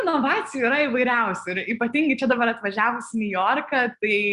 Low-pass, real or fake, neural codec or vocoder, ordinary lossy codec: 10.8 kHz; real; none; Opus, 64 kbps